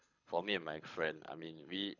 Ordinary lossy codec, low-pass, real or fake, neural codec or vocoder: none; 7.2 kHz; fake; codec, 24 kHz, 6 kbps, HILCodec